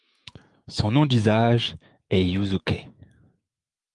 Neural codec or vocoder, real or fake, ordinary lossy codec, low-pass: autoencoder, 48 kHz, 128 numbers a frame, DAC-VAE, trained on Japanese speech; fake; Opus, 32 kbps; 10.8 kHz